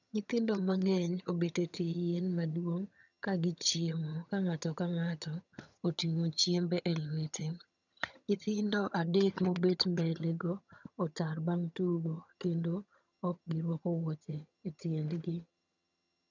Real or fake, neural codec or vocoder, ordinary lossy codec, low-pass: fake; vocoder, 22.05 kHz, 80 mel bands, HiFi-GAN; none; 7.2 kHz